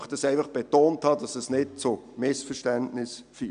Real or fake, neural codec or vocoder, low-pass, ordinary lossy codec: real; none; 9.9 kHz; none